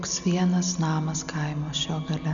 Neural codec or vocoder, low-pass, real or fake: none; 7.2 kHz; real